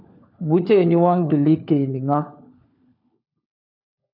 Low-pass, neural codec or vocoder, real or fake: 5.4 kHz; codec, 16 kHz, 4 kbps, FunCodec, trained on LibriTTS, 50 frames a second; fake